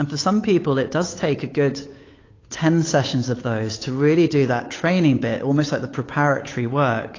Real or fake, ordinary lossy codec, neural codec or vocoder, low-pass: fake; AAC, 32 kbps; codec, 16 kHz, 8 kbps, FunCodec, trained on Chinese and English, 25 frames a second; 7.2 kHz